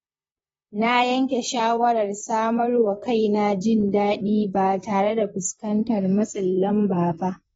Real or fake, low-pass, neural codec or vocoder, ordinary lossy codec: fake; 19.8 kHz; vocoder, 44.1 kHz, 128 mel bands, Pupu-Vocoder; AAC, 24 kbps